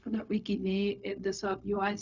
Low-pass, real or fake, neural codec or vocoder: 7.2 kHz; fake; codec, 16 kHz, 0.4 kbps, LongCat-Audio-Codec